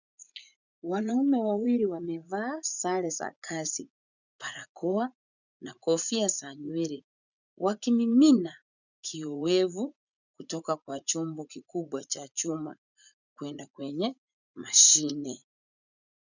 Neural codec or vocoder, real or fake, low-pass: vocoder, 44.1 kHz, 80 mel bands, Vocos; fake; 7.2 kHz